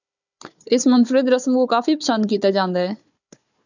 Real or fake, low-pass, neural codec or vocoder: fake; 7.2 kHz; codec, 16 kHz, 4 kbps, FunCodec, trained on Chinese and English, 50 frames a second